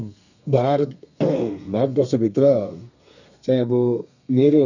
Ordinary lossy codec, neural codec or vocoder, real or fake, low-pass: none; codec, 32 kHz, 1.9 kbps, SNAC; fake; 7.2 kHz